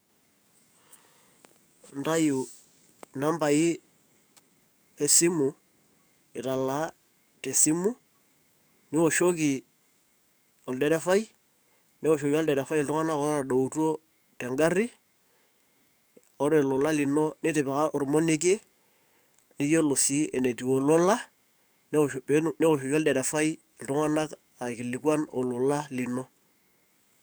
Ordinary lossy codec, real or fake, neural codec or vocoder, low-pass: none; fake; codec, 44.1 kHz, 7.8 kbps, DAC; none